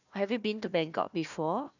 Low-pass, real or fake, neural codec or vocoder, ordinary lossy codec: 7.2 kHz; fake; codec, 16 kHz, 1 kbps, FunCodec, trained on Chinese and English, 50 frames a second; none